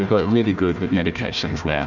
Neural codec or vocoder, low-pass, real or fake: codec, 16 kHz, 1 kbps, FunCodec, trained on Chinese and English, 50 frames a second; 7.2 kHz; fake